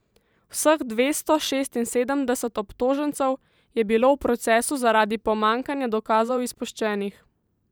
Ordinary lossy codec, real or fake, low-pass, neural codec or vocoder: none; fake; none; vocoder, 44.1 kHz, 128 mel bands every 512 samples, BigVGAN v2